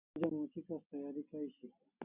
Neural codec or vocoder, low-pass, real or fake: none; 3.6 kHz; real